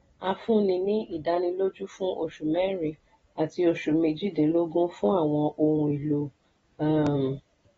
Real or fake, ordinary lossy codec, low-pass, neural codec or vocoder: real; AAC, 24 kbps; 10.8 kHz; none